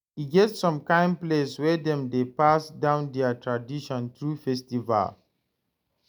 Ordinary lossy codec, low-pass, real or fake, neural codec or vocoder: none; none; real; none